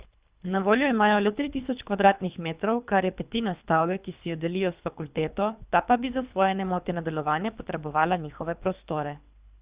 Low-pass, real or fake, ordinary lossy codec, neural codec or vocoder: 3.6 kHz; fake; Opus, 64 kbps; codec, 24 kHz, 3 kbps, HILCodec